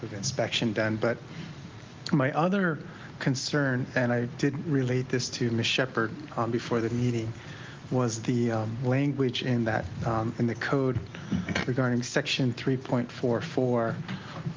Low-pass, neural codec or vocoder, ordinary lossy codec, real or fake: 7.2 kHz; none; Opus, 32 kbps; real